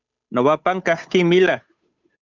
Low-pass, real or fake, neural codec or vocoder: 7.2 kHz; fake; codec, 16 kHz, 8 kbps, FunCodec, trained on Chinese and English, 25 frames a second